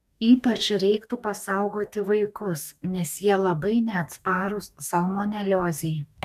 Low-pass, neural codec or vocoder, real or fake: 14.4 kHz; codec, 44.1 kHz, 2.6 kbps, DAC; fake